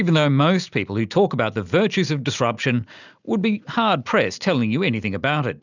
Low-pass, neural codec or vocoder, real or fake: 7.2 kHz; none; real